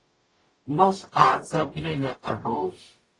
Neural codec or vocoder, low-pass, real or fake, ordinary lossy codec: codec, 44.1 kHz, 0.9 kbps, DAC; 10.8 kHz; fake; AAC, 32 kbps